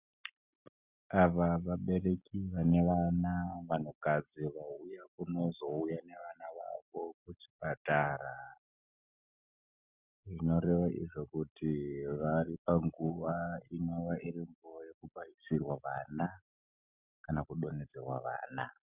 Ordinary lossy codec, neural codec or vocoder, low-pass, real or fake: Opus, 64 kbps; none; 3.6 kHz; real